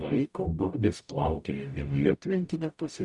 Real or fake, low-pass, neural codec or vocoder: fake; 10.8 kHz; codec, 44.1 kHz, 0.9 kbps, DAC